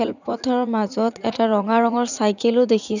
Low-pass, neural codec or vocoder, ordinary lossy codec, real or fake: 7.2 kHz; vocoder, 44.1 kHz, 80 mel bands, Vocos; none; fake